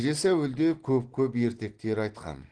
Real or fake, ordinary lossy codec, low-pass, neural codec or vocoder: real; Opus, 16 kbps; 9.9 kHz; none